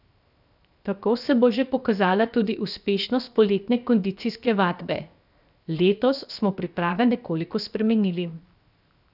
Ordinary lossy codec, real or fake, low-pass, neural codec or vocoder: none; fake; 5.4 kHz; codec, 16 kHz, 0.7 kbps, FocalCodec